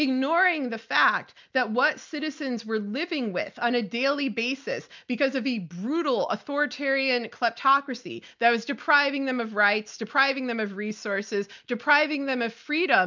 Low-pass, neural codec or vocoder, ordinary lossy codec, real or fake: 7.2 kHz; none; MP3, 64 kbps; real